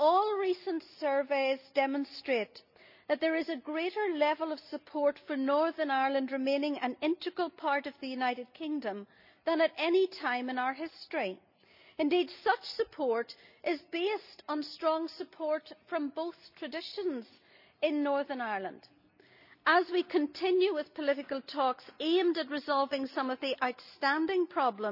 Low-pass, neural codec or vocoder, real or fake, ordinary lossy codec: 5.4 kHz; none; real; none